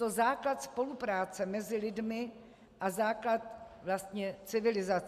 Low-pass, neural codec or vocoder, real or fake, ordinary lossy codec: 14.4 kHz; none; real; MP3, 96 kbps